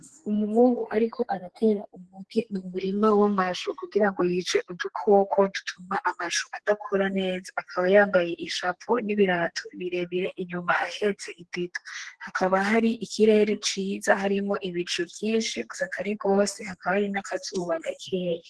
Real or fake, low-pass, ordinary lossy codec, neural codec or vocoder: fake; 10.8 kHz; Opus, 16 kbps; codec, 32 kHz, 1.9 kbps, SNAC